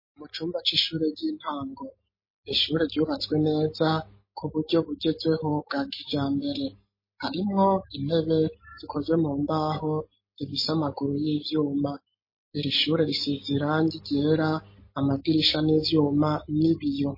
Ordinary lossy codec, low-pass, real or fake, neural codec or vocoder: MP3, 24 kbps; 5.4 kHz; real; none